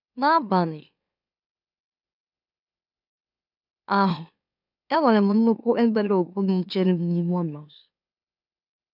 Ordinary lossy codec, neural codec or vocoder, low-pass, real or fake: none; autoencoder, 44.1 kHz, a latent of 192 numbers a frame, MeloTTS; 5.4 kHz; fake